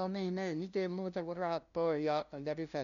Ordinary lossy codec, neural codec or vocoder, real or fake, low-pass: none; codec, 16 kHz, 0.5 kbps, FunCodec, trained on LibriTTS, 25 frames a second; fake; 7.2 kHz